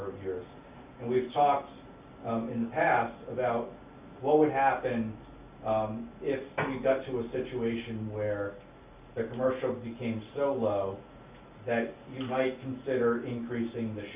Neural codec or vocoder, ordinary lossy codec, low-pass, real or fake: none; Opus, 64 kbps; 3.6 kHz; real